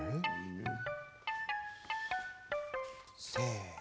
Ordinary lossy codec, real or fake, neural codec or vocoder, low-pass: none; real; none; none